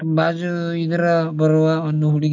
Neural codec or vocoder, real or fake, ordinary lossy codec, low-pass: none; real; none; 7.2 kHz